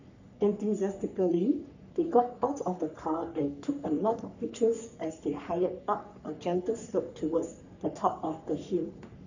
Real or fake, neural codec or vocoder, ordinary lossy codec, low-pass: fake; codec, 44.1 kHz, 3.4 kbps, Pupu-Codec; none; 7.2 kHz